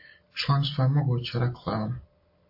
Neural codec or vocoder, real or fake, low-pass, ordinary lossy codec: none; real; 5.4 kHz; MP3, 32 kbps